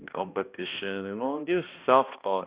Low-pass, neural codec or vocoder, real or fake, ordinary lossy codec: 3.6 kHz; codec, 16 kHz, 1 kbps, X-Codec, HuBERT features, trained on balanced general audio; fake; Opus, 64 kbps